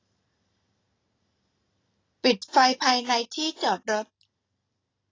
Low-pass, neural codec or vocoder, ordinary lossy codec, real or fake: 7.2 kHz; none; AAC, 32 kbps; real